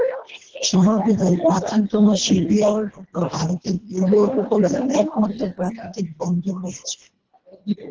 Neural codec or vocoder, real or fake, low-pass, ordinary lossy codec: codec, 24 kHz, 1.5 kbps, HILCodec; fake; 7.2 kHz; Opus, 16 kbps